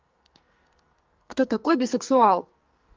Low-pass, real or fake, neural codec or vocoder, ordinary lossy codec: 7.2 kHz; fake; codec, 44.1 kHz, 2.6 kbps, SNAC; Opus, 24 kbps